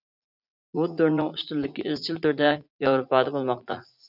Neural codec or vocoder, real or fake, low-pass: vocoder, 44.1 kHz, 80 mel bands, Vocos; fake; 5.4 kHz